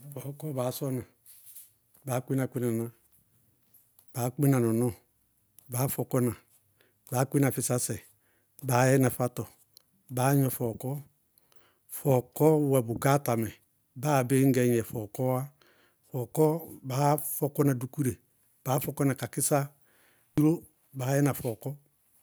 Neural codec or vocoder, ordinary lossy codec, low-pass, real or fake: none; none; none; real